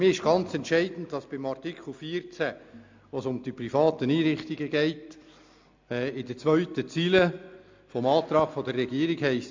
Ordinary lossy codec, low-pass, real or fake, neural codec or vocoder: MP3, 64 kbps; 7.2 kHz; real; none